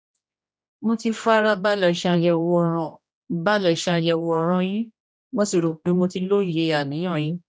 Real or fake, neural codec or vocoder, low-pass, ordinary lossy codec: fake; codec, 16 kHz, 1 kbps, X-Codec, HuBERT features, trained on general audio; none; none